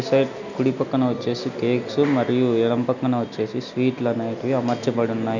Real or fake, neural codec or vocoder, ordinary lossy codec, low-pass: real; none; none; 7.2 kHz